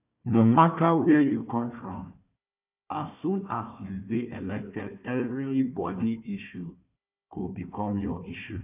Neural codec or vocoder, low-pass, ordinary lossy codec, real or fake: codec, 16 kHz, 1 kbps, FunCodec, trained on Chinese and English, 50 frames a second; 3.6 kHz; none; fake